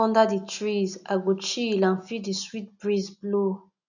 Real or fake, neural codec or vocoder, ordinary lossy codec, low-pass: real; none; none; 7.2 kHz